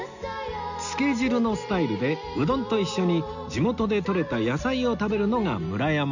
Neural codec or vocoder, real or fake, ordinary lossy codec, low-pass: none; real; none; 7.2 kHz